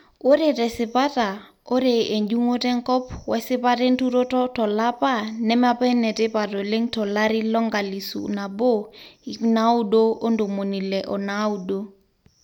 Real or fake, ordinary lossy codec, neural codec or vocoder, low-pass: real; none; none; 19.8 kHz